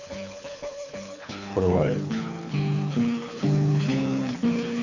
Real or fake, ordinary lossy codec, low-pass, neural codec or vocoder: fake; none; 7.2 kHz; codec, 24 kHz, 6 kbps, HILCodec